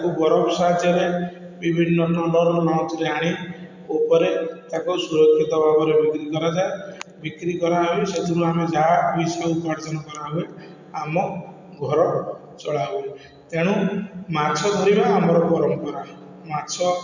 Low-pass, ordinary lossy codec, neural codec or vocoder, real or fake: 7.2 kHz; none; none; real